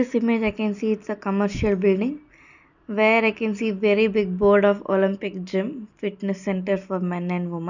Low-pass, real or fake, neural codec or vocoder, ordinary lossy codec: 7.2 kHz; real; none; none